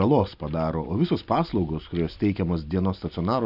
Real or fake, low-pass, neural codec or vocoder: real; 5.4 kHz; none